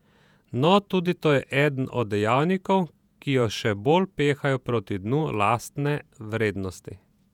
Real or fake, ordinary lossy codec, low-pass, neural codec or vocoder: fake; none; 19.8 kHz; vocoder, 48 kHz, 128 mel bands, Vocos